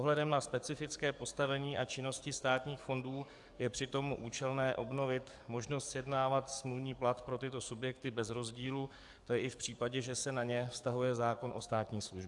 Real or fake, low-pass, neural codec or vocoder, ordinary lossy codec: fake; 10.8 kHz; codec, 44.1 kHz, 7.8 kbps, DAC; AAC, 64 kbps